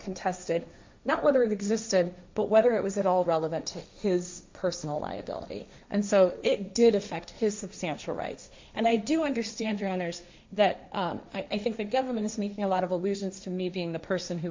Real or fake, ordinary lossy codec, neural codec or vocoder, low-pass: fake; MP3, 64 kbps; codec, 16 kHz, 1.1 kbps, Voila-Tokenizer; 7.2 kHz